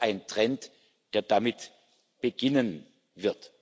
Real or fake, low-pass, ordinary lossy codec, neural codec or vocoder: real; none; none; none